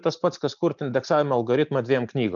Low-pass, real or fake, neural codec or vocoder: 7.2 kHz; real; none